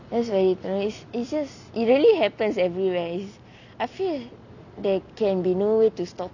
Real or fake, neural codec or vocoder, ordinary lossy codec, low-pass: real; none; none; 7.2 kHz